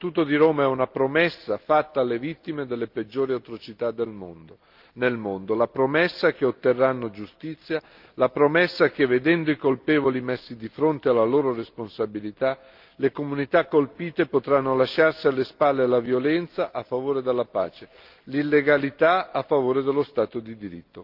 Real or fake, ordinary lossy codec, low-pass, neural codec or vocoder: real; Opus, 32 kbps; 5.4 kHz; none